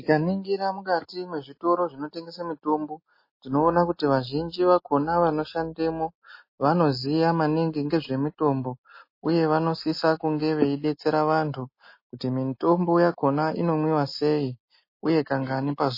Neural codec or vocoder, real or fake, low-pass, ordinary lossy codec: none; real; 5.4 kHz; MP3, 24 kbps